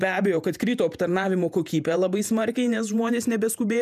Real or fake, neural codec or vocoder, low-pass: fake; vocoder, 48 kHz, 128 mel bands, Vocos; 14.4 kHz